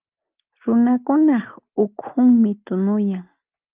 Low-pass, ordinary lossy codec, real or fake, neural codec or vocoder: 3.6 kHz; Opus, 32 kbps; real; none